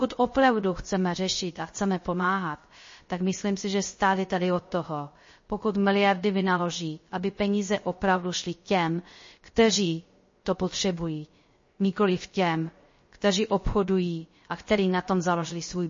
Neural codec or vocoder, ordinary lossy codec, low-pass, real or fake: codec, 16 kHz, 0.3 kbps, FocalCodec; MP3, 32 kbps; 7.2 kHz; fake